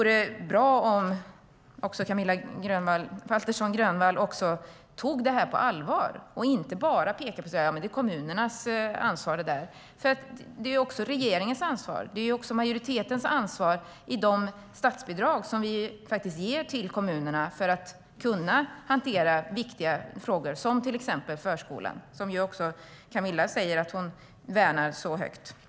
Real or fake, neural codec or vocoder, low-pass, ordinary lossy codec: real; none; none; none